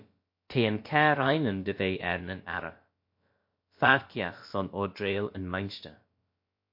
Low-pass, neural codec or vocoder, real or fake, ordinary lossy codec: 5.4 kHz; codec, 16 kHz, about 1 kbps, DyCAST, with the encoder's durations; fake; MP3, 32 kbps